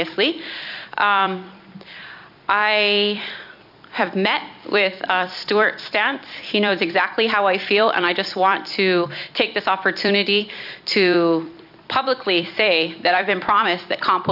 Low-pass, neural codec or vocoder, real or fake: 5.4 kHz; vocoder, 44.1 kHz, 128 mel bands every 256 samples, BigVGAN v2; fake